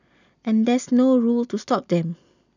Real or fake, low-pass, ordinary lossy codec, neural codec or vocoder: real; 7.2 kHz; none; none